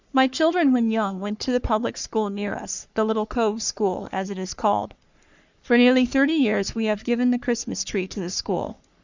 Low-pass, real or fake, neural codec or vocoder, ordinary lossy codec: 7.2 kHz; fake; codec, 44.1 kHz, 3.4 kbps, Pupu-Codec; Opus, 64 kbps